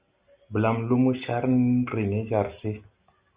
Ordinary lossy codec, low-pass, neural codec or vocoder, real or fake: Opus, 64 kbps; 3.6 kHz; none; real